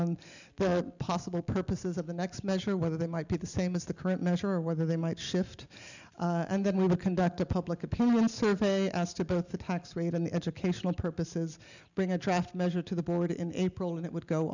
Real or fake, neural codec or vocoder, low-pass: real; none; 7.2 kHz